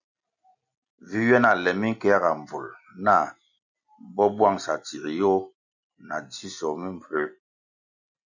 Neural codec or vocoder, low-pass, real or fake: none; 7.2 kHz; real